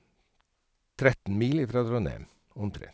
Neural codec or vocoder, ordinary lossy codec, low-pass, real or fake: none; none; none; real